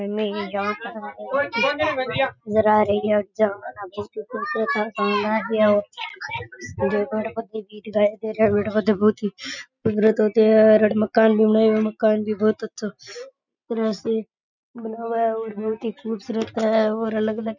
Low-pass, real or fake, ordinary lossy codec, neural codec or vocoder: 7.2 kHz; real; none; none